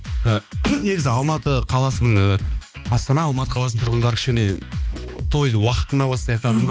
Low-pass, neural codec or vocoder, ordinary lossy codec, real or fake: none; codec, 16 kHz, 2 kbps, X-Codec, HuBERT features, trained on balanced general audio; none; fake